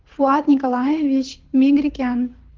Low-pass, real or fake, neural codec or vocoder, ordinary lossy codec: 7.2 kHz; fake; codec, 24 kHz, 6 kbps, HILCodec; Opus, 16 kbps